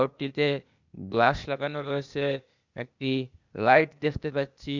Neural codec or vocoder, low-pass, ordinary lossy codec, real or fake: codec, 16 kHz, 0.8 kbps, ZipCodec; 7.2 kHz; none; fake